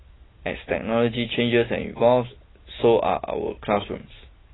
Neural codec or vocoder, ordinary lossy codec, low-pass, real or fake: none; AAC, 16 kbps; 7.2 kHz; real